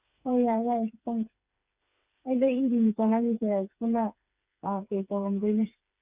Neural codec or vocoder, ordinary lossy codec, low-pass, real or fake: codec, 16 kHz, 4 kbps, FreqCodec, smaller model; Opus, 64 kbps; 3.6 kHz; fake